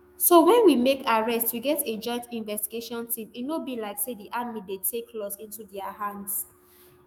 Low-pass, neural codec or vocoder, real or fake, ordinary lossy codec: none; autoencoder, 48 kHz, 128 numbers a frame, DAC-VAE, trained on Japanese speech; fake; none